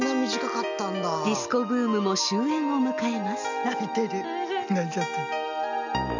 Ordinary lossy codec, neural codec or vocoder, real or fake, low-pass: none; none; real; 7.2 kHz